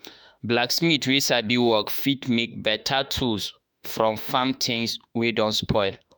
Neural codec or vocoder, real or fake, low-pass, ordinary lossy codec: autoencoder, 48 kHz, 32 numbers a frame, DAC-VAE, trained on Japanese speech; fake; none; none